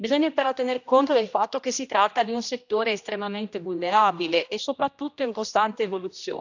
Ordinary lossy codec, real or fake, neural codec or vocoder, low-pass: none; fake; codec, 16 kHz, 1 kbps, X-Codec, HuBERT features, trained on general audio; 7.2 kHz